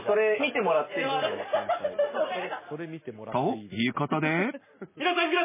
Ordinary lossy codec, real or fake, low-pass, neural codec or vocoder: MP3, 16 kbps; real; 3.6 kHz; none